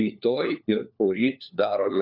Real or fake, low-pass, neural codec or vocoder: fake; 5.4 kHz; codec, 16 kHz, 4 kbps, FunCodec, trained on LibriTTS, 50 frames a second